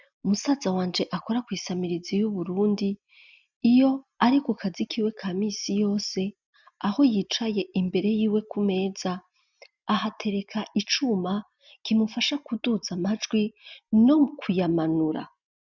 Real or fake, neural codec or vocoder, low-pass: real; none; 7.2 kHz